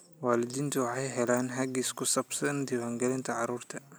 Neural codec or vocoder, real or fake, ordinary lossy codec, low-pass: none; real; none; none